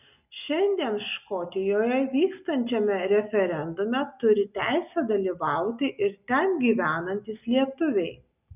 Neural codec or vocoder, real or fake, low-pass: none; real; 3.6 kHz